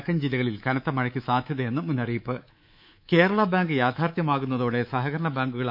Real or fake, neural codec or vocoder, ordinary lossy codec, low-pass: fake; codec, 24 kHz, 3.1 kbps, DualCodec; none; 5.4 kHz